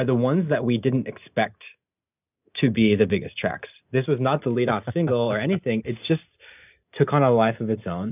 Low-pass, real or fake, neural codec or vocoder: 3.6 kHz; real; none